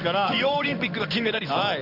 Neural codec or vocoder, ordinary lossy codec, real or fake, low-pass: codec, 16 kHz in and 24 kHz out, 1 kbps, XY-Tokenizer; none; fake; 5.4 kHz